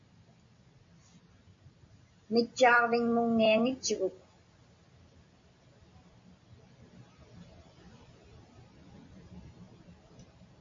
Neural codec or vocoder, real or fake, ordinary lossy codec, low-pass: none; real; AAC, 48 kbps; 7.2 kHz